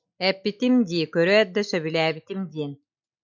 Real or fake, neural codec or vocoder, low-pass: real; none; 7.2 kHz